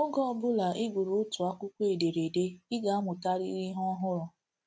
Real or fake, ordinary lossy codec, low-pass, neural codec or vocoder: real; none; none; none